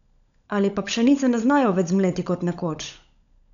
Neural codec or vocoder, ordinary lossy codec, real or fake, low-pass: codec, 16 kHz, 16 kbps, FunCodec, trained on LibriTTS, 50 frames a second; none; fake; 7.2 kHz